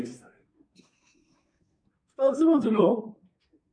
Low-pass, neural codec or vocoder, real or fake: 9.9 kHz; codec, 24 kHz, 1 kbps, SNAC; fake